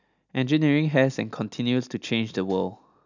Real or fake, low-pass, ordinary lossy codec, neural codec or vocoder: real; 7.2 kHz; none; none